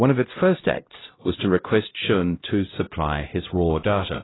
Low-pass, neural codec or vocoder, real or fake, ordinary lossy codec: 7.2 kHz; codec, 16 kHz, 0.5 kbps, X-Codec, HuBERT features, trained on LibriSpeech; fake; AAC, 16 kbps